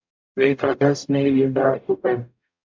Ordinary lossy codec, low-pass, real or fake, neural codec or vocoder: MP3, 64 kbps; 7.2 kHz; fake; codec, 44.1 kHz, 0.9 kbps, DAC